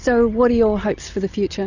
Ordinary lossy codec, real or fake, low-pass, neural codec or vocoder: Opus, 64 kbps; real; 7.2 kHz; none